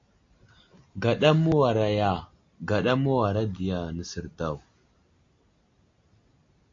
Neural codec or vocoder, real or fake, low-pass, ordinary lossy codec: none; real; 7.2 kHz; AAC, 48 kbps